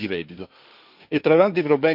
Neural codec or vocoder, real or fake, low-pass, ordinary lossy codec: codec, 16 kHz, 1.1 kbps, Voila-Tokenizer; fake; 5.4 kHz; none